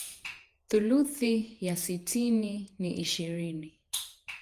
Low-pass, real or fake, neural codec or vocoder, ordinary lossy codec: 14.4 kHz; fake; vocoder, 48 kHz, 128 mel bands, Vocos; Opus, 32 kbps